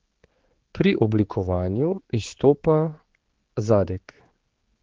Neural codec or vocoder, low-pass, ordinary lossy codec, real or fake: codec, 16 kHz, 4 kbps, X-Codec, HuBERT features, trained on general audio; 7.2 kHz; Opus, 16 kbps; fake